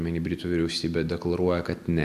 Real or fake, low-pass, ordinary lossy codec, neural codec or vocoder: real; 14.4 kHz; AAC, 96 kbps; none